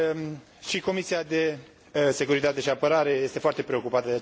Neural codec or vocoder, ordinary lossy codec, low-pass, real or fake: none; none; none; real